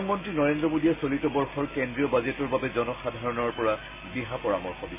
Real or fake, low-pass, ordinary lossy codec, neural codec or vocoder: real; 3.6 kHz; MP3, 16 kbps; none